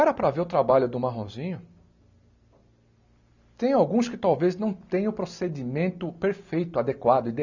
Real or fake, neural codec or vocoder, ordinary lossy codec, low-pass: real; none; none; 7.2 kHz